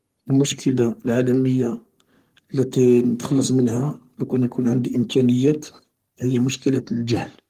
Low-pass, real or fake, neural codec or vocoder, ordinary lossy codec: 14.4 kHz; fake; codec, 44.1 kHz, 3.4 kbps, Pupu-Codec; Opus, 24 kbps